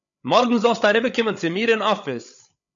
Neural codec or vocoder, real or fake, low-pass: codec, 16 kHz, 8 kbps, FreqCodec, larger model; fake; 7.2 kHz